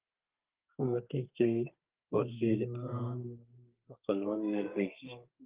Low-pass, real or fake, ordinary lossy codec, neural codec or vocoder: 3.6 kHz; fake; Opus, 32 kbps; codec, 32 kHz, 1.9 kbps, SNAC